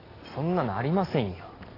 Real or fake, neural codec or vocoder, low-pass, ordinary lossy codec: real; none; 5.4 kHz; AAC, 24 kbps